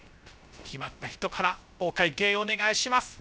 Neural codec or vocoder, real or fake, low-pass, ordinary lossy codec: codec, 16 kHz, 0.3 kbps, FocalCodec; fake; none; none